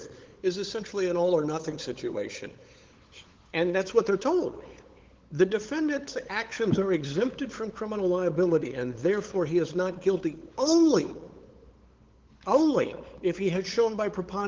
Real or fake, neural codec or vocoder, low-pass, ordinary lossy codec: fake; codec, 16 kHz, 8 kbps, FunCodec, trained on LibriTTS, 25 frames a second; 7.2 kHz; Opus, 16 kbps